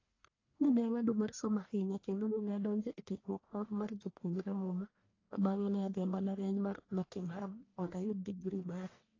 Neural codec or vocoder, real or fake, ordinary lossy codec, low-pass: codec, 44.1 kHz, 1.7 kbps, Pupu-Codec; fake; AAC, 32 kbps; 7.2 kHz